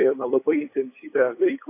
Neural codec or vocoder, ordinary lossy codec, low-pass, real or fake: codec, 16 kHz, 8 kbps, FunCodec, trained on LibriTTS, 25 frames a second; AAC, 24 kbps; 3.6 kHz; fake